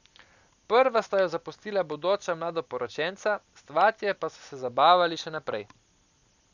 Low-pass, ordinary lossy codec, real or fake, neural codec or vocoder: 7.2 kHz; none; real; none